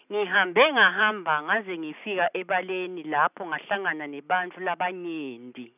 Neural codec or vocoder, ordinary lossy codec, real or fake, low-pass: none; none; real; 3.6 kHz